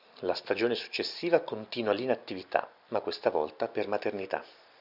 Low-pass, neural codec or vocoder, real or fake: 5.4 kHz; none; real